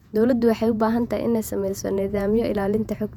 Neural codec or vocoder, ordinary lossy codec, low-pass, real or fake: vocoder, 48 kHz, 128 mel bands, Vocos; none; 19.8 kHz; fake